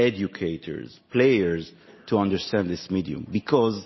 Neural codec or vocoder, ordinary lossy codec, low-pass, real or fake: none; MP3, 24 kbps; 7.2 kHz; real